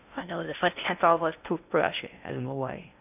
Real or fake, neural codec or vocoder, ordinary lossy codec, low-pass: fake; codec, 16 kHz in and 24 kHz out, 0.6 kbps, FocalCodec, streaming, 2048 codes; none; 3.6 kHz